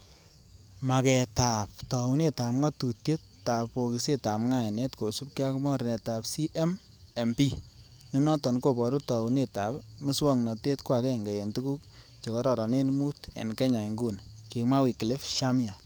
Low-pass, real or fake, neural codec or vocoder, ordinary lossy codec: none; fake; codec, 44.1 kHz, 7.8 kbps, DAC; none